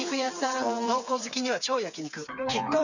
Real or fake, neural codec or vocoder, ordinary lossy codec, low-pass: fake; codec, 16 kHz, 4 kbps, FreqCodec, smaller model; none; 7.2 kHz